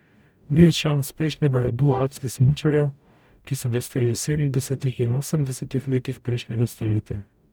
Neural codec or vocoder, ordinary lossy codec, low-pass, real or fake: codec, 44.1 kHz, 0.9 kbps, DAC; none; none; fake